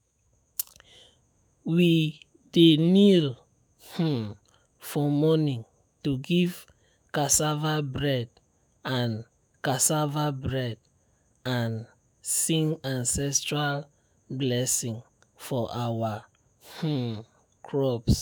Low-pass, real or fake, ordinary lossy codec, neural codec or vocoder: none; fake; none; autoencoder, 48 kHz, 128 numbers a frame, DAC-VAE, trained on Japanese speech